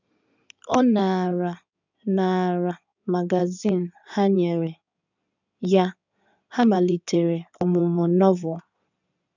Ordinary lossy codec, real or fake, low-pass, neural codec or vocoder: none; fake; 7.2 kHz; codec, 16 kHz in and 24 kHz out, 2.2 kbps, FireRedTTS-2 codec